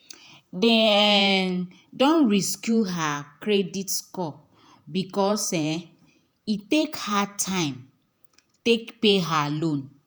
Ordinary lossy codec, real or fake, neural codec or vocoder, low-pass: none; fake; vocoder, 48 kHz, 128 mel bands, Vocos; none